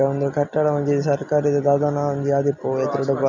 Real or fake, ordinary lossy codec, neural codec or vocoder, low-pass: real; none; none; 7.2 kHz